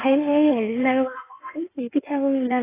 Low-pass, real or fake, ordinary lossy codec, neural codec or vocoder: 3.6 kHz; fake; AAC, 16 kbps; codec, 16 kHz in and 24 kHz out, 1.1 kbps, FireRedTTS-2 codec